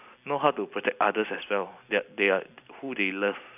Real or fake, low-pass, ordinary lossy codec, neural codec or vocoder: real; 3.6 kHz; none; none